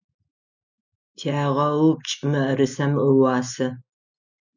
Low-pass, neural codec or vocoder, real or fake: 7.2 kHz; none; real